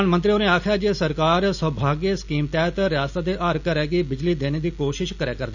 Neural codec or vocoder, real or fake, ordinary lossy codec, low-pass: none; real; none; 7.2 kHz